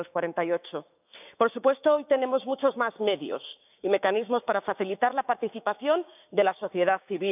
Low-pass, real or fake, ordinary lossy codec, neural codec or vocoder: 3.6 kHz; fake; none; codec, 16 kHz, 6 kbps, DAC